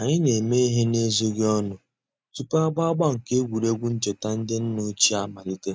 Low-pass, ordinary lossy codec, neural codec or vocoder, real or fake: none; none; none; real